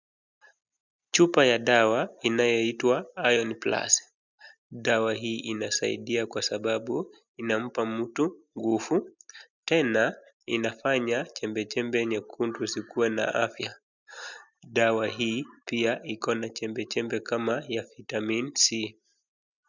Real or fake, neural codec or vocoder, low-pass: real; none; 7.2 kHz